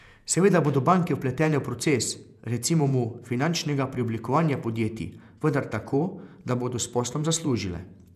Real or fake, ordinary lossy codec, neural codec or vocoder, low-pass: fake; none; vocoder, 48 kHz, 128 mel bands, Vocos; 14.4 kHz